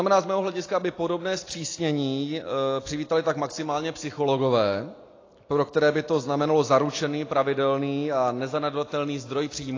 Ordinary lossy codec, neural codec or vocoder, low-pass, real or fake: AAC, 32 kbps; none; 7.2 kHz; real